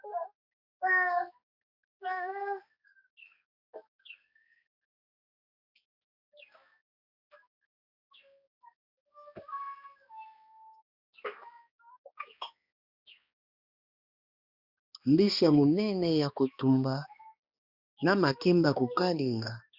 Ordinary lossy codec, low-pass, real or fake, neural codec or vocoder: Opus, 64 kbps; 5.4 kHz; fake; codec, 16 kHz, 2 kbps, X-Codec, HuBERT features, trained on balanced general audio